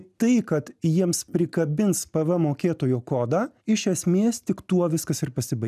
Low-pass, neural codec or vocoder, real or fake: 14.4 kHz; none; real